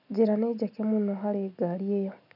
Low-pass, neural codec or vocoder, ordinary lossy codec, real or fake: 5.4 kHz; none; none; real